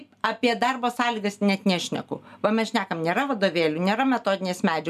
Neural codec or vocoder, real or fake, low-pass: none; real; 14.4 kHz